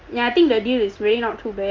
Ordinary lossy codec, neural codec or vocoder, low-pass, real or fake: Opus, 32 kbps; none; 7.2 kHz; real